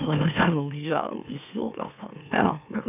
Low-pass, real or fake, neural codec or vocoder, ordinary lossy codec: 3.6 kHz; fake; autoencoder, 44.1 kHz, a latent of 192 numbers a frame, MeloTTS; none